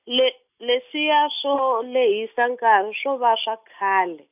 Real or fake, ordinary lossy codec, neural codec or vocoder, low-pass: real; none; none; 3.6 kHz